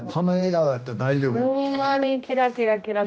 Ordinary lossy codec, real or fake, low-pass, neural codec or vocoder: none; fake; none; codec, 16 kHz, 1 kbps, X-Codec, HuBERT features, trained on general audio